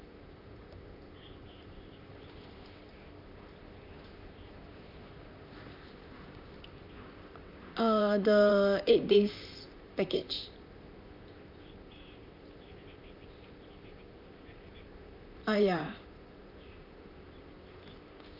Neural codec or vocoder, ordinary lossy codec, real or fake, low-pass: vocoder, 44.1 kHz, 128 mel bands, Pupu-Vocoder; none; fake; 5.4 kHz